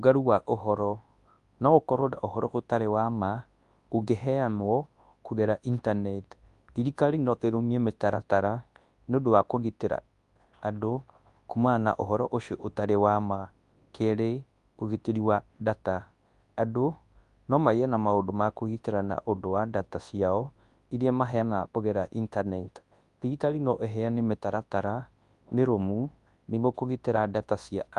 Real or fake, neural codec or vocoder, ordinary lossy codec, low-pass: fake; codec, 24 kHz, 0.9 kbps, WavTokenizer, large speech release; Opus, 32 kbps; 10.8 kHz